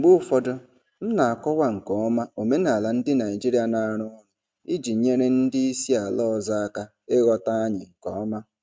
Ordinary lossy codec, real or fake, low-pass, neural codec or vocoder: none; real; none; none